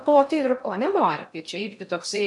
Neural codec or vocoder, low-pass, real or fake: codec, 16 kHz in and 24 kHz out, 0.6 kbps, FocalCodec, streaming, 2048 codes; 10.8 kHz; fake